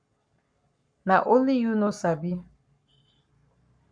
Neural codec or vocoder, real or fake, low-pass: codec, 44.1 kHz, 7.8 kbps, Pupu-Codec; fake; 9.9 kHz